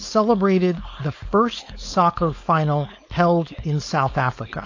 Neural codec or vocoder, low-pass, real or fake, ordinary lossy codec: codec, 16 kHz, 4.8 kbps, FACodec; 7.2 kHz; fake; AAC, 48 kbps